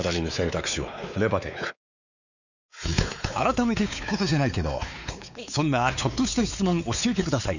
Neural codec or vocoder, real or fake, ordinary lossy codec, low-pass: codec, 16 kHz, 4 kbps, X-Codec, WavLM features, trained on Multilingual LibriSpeech; fake; none; 7.2 kHz